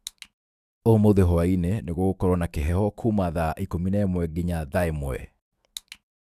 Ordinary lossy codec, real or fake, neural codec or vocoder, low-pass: Opus, 64 kbps; fake; autoencoder, 48 kHz, 128 numbers a frame, DAC-VAE, trained on Japanese speech; 14.4 kHz